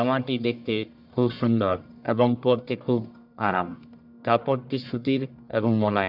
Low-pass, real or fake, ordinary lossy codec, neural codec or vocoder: 5.4 kHz; fake; none; codec, 44.1 kHz, 1.7 kbps, Pupu-Codec